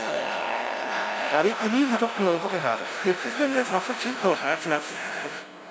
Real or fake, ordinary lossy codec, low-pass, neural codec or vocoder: fake; none; none; codec, 16 kHz, 0.5 kbps, FunCodec, trained on LibriTTS, 25 frames a second